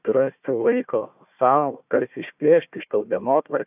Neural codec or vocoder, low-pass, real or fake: codec, 16 kHz, 1 kbps, FunCodec, trained on Chinese and English, 50 frames a second; 3.6 kHz; fake